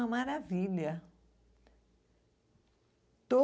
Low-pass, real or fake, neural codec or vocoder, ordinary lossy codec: none; real; none; none